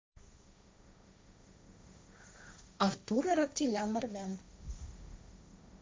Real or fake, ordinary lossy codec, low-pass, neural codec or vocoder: fake; none; none; codec, 16 kHz, 1.1 kbps, Voila-Tokenizer